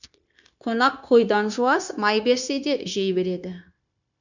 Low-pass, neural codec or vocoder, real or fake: 7.2 kHz; codec, 16 kHz, 0.9 kbps, LongCat-Audio-Codec; fake